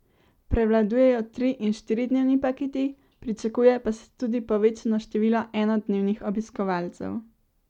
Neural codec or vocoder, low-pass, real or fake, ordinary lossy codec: none; 19.8 kHz; real; none